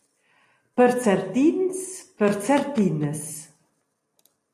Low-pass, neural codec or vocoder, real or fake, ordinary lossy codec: 14.4 kHz; none; real; AAC, 48 kbps